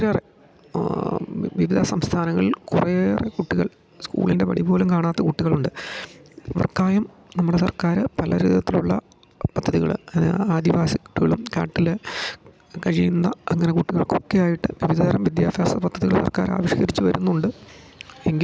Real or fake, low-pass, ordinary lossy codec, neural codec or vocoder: real; none; none; none